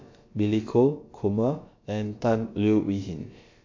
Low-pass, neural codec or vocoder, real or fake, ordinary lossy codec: 7.2 kHz; codec, 16 kHz, about 1 kbps, DyCAST, with the encoder's durations; fake; MP3, 48 kbps